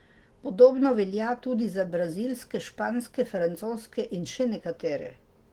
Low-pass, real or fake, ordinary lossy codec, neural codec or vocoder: 19.8 kHz; fake; Opus, 16 kbps; autoencoder, 48 kHz, 128 numbers a frame, DAC-VAE, trained on Japanese speech